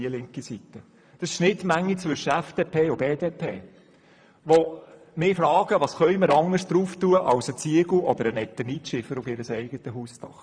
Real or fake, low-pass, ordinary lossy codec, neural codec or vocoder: fake; 9.9 kHz; none; vocoder, 44.1 kHz, 128 mel bands, Pupu-Vocoder